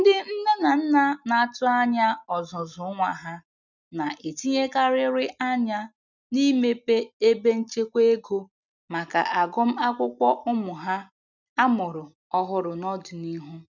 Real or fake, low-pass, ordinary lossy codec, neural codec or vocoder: real; 7.2 kHz; none; none